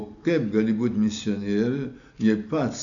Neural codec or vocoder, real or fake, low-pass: none; real; 7.2 kHz